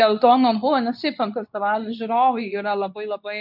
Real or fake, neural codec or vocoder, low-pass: fake; codec, 24 kHz, 0.9 kbps, WavTokenizer, medium speech release version 2; 5.4 kHz